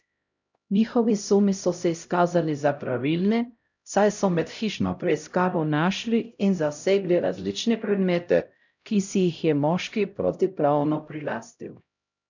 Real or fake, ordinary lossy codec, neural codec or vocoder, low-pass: fake; none; codec, 16 kHz, 0.5 kbps, X-Codec, HuBERT features, trained on LibriSpeech; 7.2 kHz